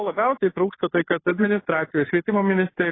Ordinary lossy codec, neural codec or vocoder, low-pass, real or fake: AAC, 16 kbps; codec, 16 kHz, 2 kbps, FunCodec, trained on Chinese and English, 25 frames a second; 7.2 kHz; fake